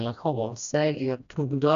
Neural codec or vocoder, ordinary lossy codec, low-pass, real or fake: codec, 16 kHz, 1 kbps, FreqCodec, smaller model; MP3, 64 kbps; 7.2 kHz; fake